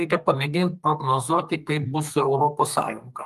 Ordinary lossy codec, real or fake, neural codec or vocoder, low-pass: Opus, 32 kbps; fake; codec, 32 kHz, 1.9 kbps, SNAC; 14.4 kHz